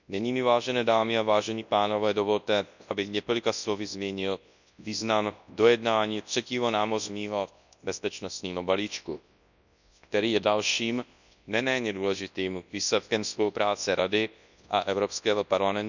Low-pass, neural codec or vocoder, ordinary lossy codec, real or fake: 7.2 kHz; codec, 24 kHz, 0.9 kbps, WavTokenizer, large speech release; none; fake